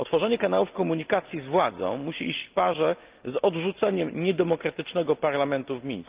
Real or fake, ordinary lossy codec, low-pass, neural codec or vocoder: real; Opus, 32 kbps; 3.6 kHz; none